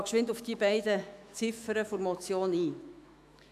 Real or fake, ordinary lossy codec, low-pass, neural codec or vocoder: fake; AAC, 96 kbps; 14.4 kHz; autoencoder, 48 kHz, 128 numbers a frame, DAC-VAE, trained on Japanese speech